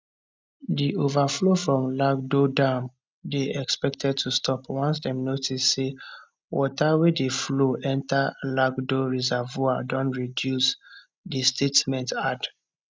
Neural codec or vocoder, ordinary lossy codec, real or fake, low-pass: none; none; real; none